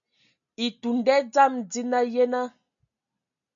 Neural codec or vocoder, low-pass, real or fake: none; 7.2 kHz; real